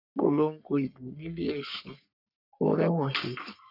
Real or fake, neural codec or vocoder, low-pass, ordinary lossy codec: fake; codec, 44.1 kHz, 3.4 kbps, Pupu-Codec; 5.4 kHz; none